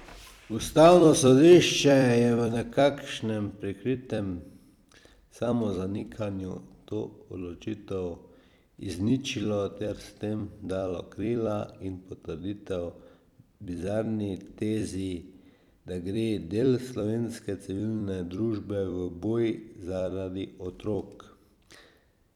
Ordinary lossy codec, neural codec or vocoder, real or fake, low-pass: none; vocoder, 44.1 kHz, 128 mel bands every 256 samples, BigVGAN v2; fake; 19.8 kHz